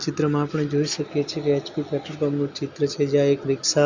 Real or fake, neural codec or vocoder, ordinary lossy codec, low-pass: real; none; Opus, 64 kbps; 7.2 kHz